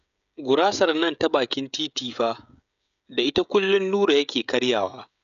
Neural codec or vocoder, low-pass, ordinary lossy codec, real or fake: codec, 16 kHz, 16 kbps, FreqCodec, smaller model; 7.2 kHz; none; fake